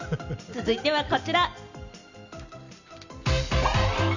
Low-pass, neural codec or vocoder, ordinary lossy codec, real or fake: 7.2 kHz; none; none; real